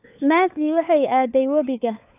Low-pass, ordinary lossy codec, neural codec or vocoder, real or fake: 3.6 kHz; none; codec, 16 kHz, 4 kbps, FunCodec, trained on LibriTTS, 50 frames a second; fake